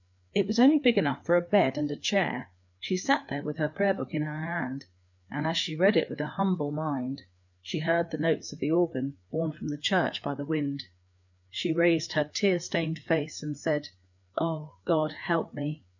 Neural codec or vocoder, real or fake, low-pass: codec, 16 kHz, 4 kbps, FreqCodec, larger model; fake; 7.2 kHz